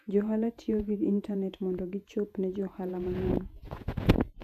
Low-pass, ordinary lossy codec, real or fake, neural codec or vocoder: 14.4 kHz; AAC, 96 kbps; fake; vocoder, 48 kHz, 128 mel bands, Vocos